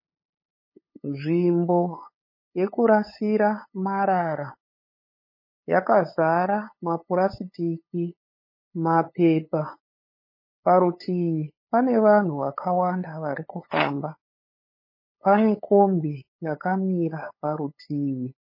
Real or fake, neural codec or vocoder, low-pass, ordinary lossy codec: fake; codec, 16 kHz, 8 kbps, FunCodec, trained on LibriTTS, 25 frames a second; 5.4 kHz; MP3, 24 kbps